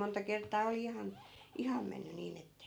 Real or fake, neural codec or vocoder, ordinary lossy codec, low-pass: real; none; none; none